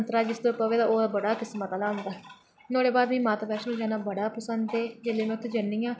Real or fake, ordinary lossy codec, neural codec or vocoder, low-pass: real; none; none; none